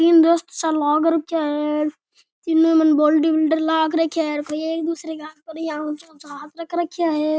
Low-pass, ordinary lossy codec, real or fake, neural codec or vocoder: none; none; real; none